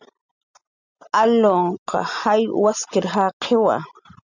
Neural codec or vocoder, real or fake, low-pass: none; real; 7.2 kHz